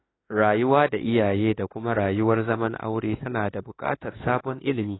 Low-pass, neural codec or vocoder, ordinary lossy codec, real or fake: 7.2 kHz; autoencoder, 48 kHz, 32 numbers a frame, DAC-VAE, trained on Japanese speech; AAC, 16 kbps; fake